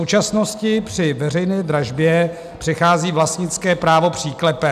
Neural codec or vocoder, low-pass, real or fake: none; 14.4 kHz; real